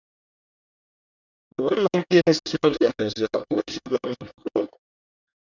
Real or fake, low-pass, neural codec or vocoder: fake; 7.2 kHz; codec, 24 kHz, 1 kbps, SNAC